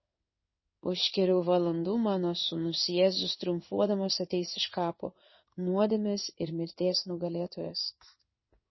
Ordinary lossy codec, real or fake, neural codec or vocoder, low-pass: MP3, 24 kbps; fake; codec, 16 kHz in and 24 kHz out, 1 kbps, XY-Tokenizer; 7.2 kHz